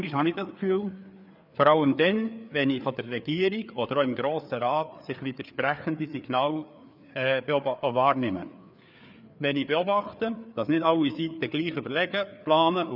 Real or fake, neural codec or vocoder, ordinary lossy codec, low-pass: fake; codec, 16 kHz, 4 kbps, FreqCodec, larger model; none; 5.4 kHz